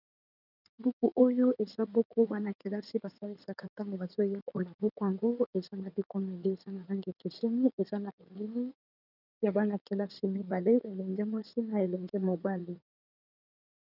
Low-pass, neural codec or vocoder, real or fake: 5.4 kHz; codec, 16 kHz in and 24 kHz out, 2.2 kbps, FireRedTTS-2 codec; fake